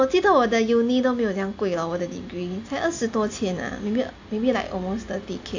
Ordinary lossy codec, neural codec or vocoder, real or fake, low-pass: AAC, 48 kbps; none; real; 7.2 kHz